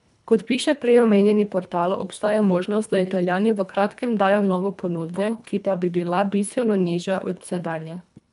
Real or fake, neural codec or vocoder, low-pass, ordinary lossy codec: fake; codec, 24 kHz, 1.5 kbps, HILCodec; 10.8 kHz; none